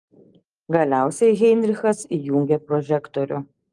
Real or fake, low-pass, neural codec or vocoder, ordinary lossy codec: real; 10.8 kHz; none; Opus, 32 kbps